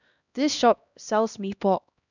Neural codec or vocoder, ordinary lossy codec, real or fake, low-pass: codec, 16 kHz, 1 kbps, X-Codec, HuBERT features, trained on LibriSpeech; none; fake; 7.2 kHz